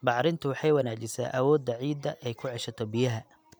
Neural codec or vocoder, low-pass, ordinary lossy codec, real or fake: none; none; none; real